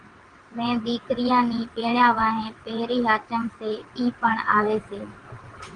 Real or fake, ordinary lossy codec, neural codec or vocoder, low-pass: fake; Opus, 24 kbps; vocoder, 22.05 kHz, 80 mel bands, WaveNeXt; 9.9 kHz